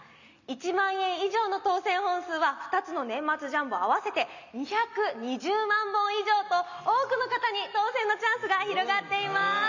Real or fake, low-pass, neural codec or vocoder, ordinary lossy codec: real; 7.2 kHz; none; none